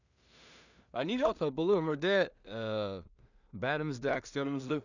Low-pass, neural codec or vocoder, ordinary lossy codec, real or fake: 7.2 kHz; codec, 16 kHz in and 24 kHz out, 0.4 kbps, LongCat-Audio-Codec, two codebook decoder; none; fake